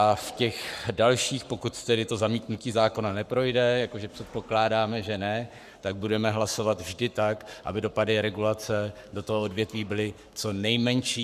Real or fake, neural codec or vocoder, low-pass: fake; codec, 44.1 kHz, 7.8 kbps, Pupu-Codec; 14.4 kHz